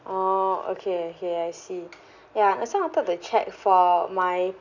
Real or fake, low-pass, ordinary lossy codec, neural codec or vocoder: real; 7.2 kHz; none; none